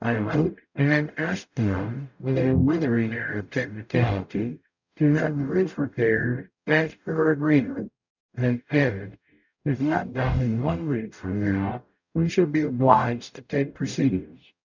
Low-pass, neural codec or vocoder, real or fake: 7.2 kHz; codec, 44.1 kHz, 0.9 kbps, DAC; fake